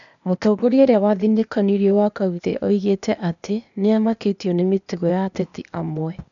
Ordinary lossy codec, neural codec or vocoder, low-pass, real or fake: none; codec, 16 kHz, 0.8 kbps, ZipCodec; 7.2 kHz; fake